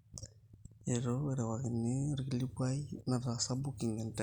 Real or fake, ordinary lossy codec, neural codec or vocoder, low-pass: real; none; none; 19.8 kHz